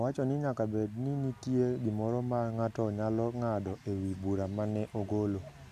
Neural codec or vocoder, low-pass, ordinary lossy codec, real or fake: none; 14.4 kHz; none; real